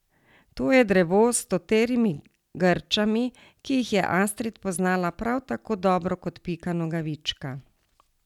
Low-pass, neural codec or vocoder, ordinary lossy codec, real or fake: 19.8 kHz; none; none; real